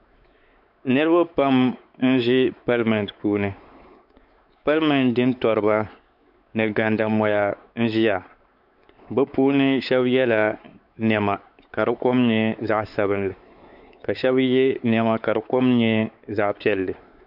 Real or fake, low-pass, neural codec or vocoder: fake; 5.4 kHz; codec, 16 kHz, 4 kbps, X-Codec, WavLM features, trained on Multilingual LibriSpeech